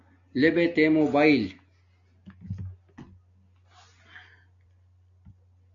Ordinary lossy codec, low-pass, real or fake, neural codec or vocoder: MP3, 96 kbps; 7.2 kHz; real; none